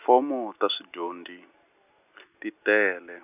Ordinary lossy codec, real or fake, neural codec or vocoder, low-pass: none; real; none; 3.6 kHz